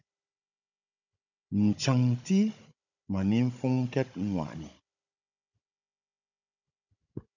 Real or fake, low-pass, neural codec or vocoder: fake; 7.2 kHz; codec, 16 kHz, 16 kbps, FunCodec, trained on Chinese and English, 50 frames a second